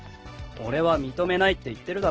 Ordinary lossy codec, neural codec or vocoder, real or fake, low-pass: Opus, 16 kbps; none; real; 7.2 kHz